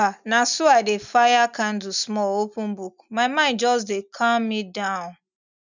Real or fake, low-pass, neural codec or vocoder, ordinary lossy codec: real; 7.2 kHz; none; none